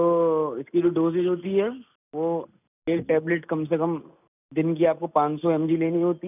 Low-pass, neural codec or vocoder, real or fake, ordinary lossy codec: 3.6 kHz; none; real; none